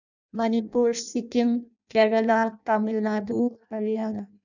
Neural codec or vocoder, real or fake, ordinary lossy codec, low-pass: codec, 16 kHz in and 24 kHz out, 0.6 kbps, FireRedTTS-2 codec; fake; none; 7.2 kHz